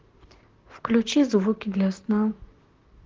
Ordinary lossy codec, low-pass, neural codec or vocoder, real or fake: Opus, 16 kbps; 7.2 kHz; vocoder, 44.1 kHz, 128 mel bands, Pupu-Vocoder; fake